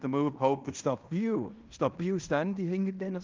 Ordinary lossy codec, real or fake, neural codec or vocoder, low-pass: Opus, 32 kbps; fake; codec, 16 kHz in and 24 kHz out, 0.9 kbps, LongCat-Audio-Codec, fine tuned four codebook decoder; 7.2 kHz